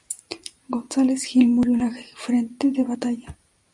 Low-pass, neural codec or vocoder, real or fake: 10.8 kHz; none; real